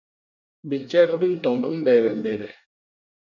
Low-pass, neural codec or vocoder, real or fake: 7.2 kHz; codec, 24 kHz, 1 kbps, SNAC; fake